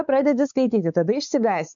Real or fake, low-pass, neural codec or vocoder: fake; 7.2 kHz; codec, 16 kHz, 4 kbps, X-Codec, WavLM features, trained on Multilingual LibriSpeech